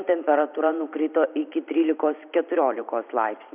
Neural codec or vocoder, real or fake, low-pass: vocoder, 44.1 kHz, 128 mel bands every 512 samples, BigVGAN v2; fake; 3.6 kHz